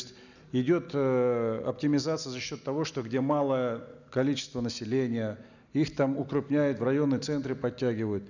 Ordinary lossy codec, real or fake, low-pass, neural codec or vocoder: none; real; 7.2 kHz; none